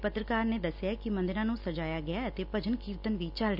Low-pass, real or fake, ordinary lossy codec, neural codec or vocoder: 5.4 kHz; real; none; none